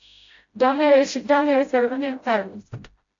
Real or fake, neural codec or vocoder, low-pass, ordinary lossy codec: fake; codec, 16 kHz, 0.5 kbps, FreqCodec, smaller model; 7.2 kHz; AAC, 48 kbps